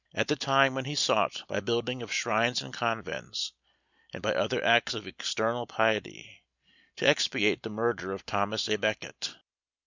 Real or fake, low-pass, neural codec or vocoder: real; 7.2 kHz; none